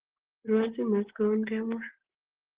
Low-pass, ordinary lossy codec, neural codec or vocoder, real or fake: 3.6 kHz; Opus, 16 kbps; none; real